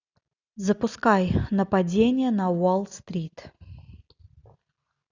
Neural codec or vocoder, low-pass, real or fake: none; 7.2 kHz; real